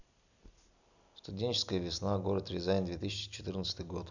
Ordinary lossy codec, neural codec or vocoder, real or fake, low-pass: none; none; real; 7.2 kHz